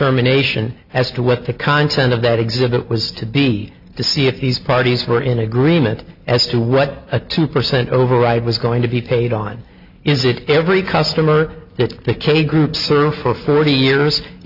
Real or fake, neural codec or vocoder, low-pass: real; none; 5.4 kHz